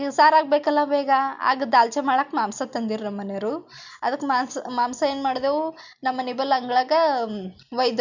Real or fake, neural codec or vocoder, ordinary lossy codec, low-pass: real; none; none; 7.2 kHz